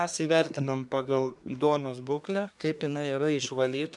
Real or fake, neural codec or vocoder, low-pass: fake; codec, 24 kHz, 1 kbps, SNAC; 10.8 kHz